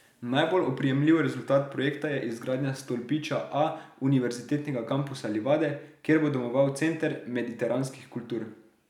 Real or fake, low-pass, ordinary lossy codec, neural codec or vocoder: real; 19.8 kHz; none; none